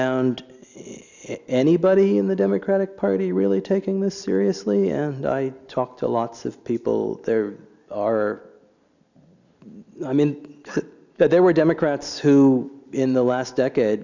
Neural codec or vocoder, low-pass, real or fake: none; 7.2 kHz; real